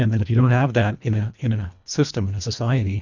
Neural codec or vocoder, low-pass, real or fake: codec, 24 kHz, 1.5 kbps, HILCodec; 7.2 kHz; fake